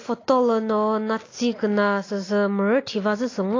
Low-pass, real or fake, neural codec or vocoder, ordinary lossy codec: 7.2 kHz; real; none; AAC, 32 kbps